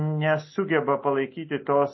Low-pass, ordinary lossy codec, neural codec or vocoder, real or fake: 7.2 kHz; MP3, 24 kbps; none; real